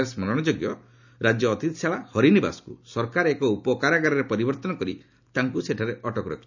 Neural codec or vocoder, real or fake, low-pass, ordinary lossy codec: none; real; 7.2 kHz; none